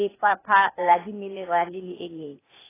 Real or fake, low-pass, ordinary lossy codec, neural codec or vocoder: fake; 3.6 kHz; AAC, 16 kbps; codec, 16 kHz, 0.8 kbps, ZipCodec